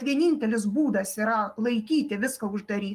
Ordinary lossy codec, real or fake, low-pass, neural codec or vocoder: Opus, 32 kbps; real; 14.4 kHz; none